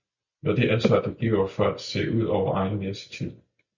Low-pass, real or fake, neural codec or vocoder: 7.2 kHz; real; none